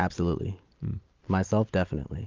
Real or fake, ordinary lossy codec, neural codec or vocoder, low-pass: real; Opus, 24 kbps; none; 7.2 kHz